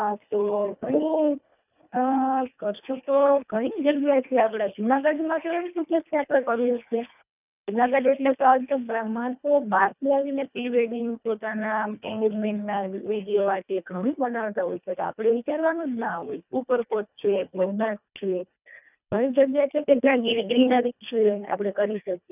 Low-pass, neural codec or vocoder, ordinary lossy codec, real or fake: 3.6 kHz; codec, 24 kHz, 1.5 kbps, HILCodec; none; fake